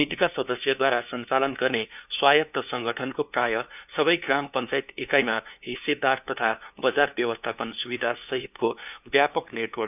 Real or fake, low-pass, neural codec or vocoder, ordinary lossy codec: fake; 3.6 kHz; codec, 16 kHz, 2 kbps, FunCodec, trained on LibriTTS, 25 frames a second; none